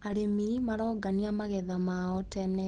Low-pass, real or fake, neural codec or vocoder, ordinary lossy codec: 9.9 kHz; real; none; Opus, 16 kbps